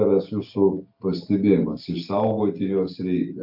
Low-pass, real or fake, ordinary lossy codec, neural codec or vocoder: 5.4 kHz; real; AAC, 48 kbps; none